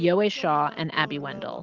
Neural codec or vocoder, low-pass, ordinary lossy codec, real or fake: none; 7.2 kHz; Opus, 32 kbps; real